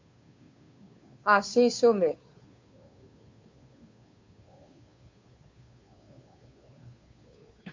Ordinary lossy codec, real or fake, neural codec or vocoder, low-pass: MP3, 48 kbps; fake; codec, 16 kHz, 2 kbps, FunCodec, trained on Chinese and English, 25 frames a second; 7.2 kHz